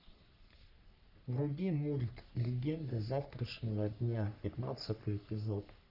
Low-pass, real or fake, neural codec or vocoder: 5.4 kHz; fake; codec, 44.1 kHz, 3.4 kbps, Pupu-Codec